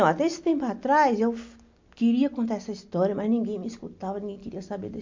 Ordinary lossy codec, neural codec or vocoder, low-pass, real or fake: MP3, 48 kbps; none; 7.2 kHz; real